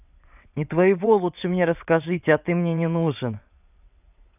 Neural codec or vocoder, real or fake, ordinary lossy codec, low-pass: vocoder, 22.05 kHz, 80 mel bands, WaveNeXt; fake; none; 3.6 kHz